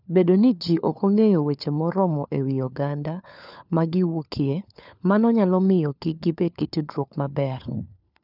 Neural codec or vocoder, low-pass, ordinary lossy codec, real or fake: codec, 16 kHz, 4 kbps, FunCodec, trained on LibriTTS, 50 frames a second; 5.4 kHz; none; fake